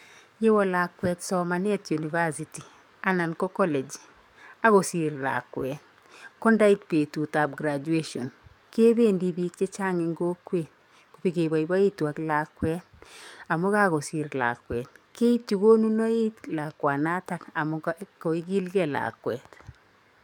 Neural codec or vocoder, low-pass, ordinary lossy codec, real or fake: codec, 44.1 kHz, 7.8 kbps, DAC; 19.8 kHz; MP3, 96 kbps; fake